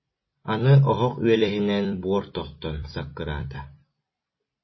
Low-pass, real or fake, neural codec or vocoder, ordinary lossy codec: 7.2 kHz; fake; vocoder, 44.1 kHz, 128 mel bands every 256 samples, BigVGAN v2; MP3, 24 kbps